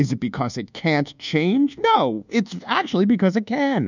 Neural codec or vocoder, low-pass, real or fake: codec, 24 kHz, 1.2 kbps, DualCodec; 7.2 kHz; fake